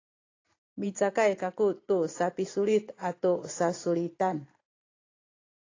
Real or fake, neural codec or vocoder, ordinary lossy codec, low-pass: fake; vocoder, 44.1 kHz, 128 mel bands every 512 samples, BigVGAN v2; AAC, 32 kbps; 7.2 kHz